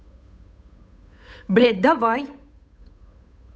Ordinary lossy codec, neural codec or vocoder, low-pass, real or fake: none; codec, 16 kHz, 8 kbps, FunCodec, trained on Chinese and English, 25 frames a second; none; fake